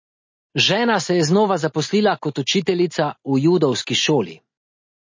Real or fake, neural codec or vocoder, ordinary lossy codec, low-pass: real; none; MP3, 32 kbps; 7.2 kHz